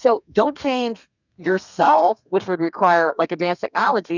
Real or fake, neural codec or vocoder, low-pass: fake; codec, 32 kHz, 1.9 kbps, SNAC; 7.2 kHz